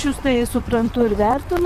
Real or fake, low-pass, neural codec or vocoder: fake; 14.4 kHz; vocoder, 44.1 kHz, 128 mel bands, Pupu-Vocoder